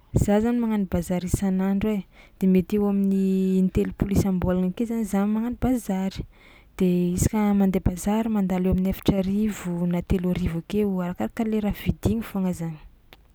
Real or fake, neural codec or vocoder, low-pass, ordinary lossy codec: real; none; none; none